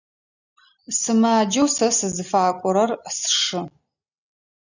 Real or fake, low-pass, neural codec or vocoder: real; 7.2 kHz; none